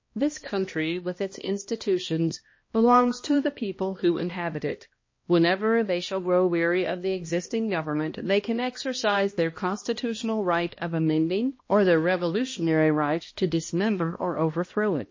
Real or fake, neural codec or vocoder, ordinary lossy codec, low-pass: fake; codec, 16 kHz, 1 kbps, X-Codec, HuBERT features, trained on balanced general audio; MP3, 32 kbps; 7.2 kHz